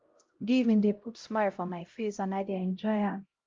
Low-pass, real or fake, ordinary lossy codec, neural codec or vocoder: 7.2 kHz; fake; Opus, 32 kbps; codec, 16 kHz, 0.5 kbps, X-Codec, HuBERT features, trained on LibriSpeech